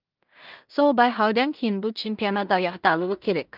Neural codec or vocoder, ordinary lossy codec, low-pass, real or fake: codec, 16 kHz in and 24 kHz out, 0.4 kbps, LongCat-Audio-Codec, two codebook decoder; Opus, 32 kbps; 5.4 kHz; fake